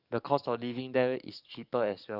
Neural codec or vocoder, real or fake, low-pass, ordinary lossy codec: codec, 44.1 kHz, 7.8 kbps, DAC; fake; 5.4 kHz; none